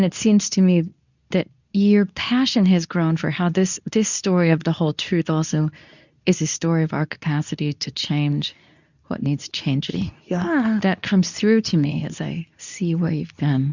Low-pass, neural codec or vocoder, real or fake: 7.2 kHz; codec, 24 kHz, 0.9 kbps, WavTokenizer, medium speech release version 2; fake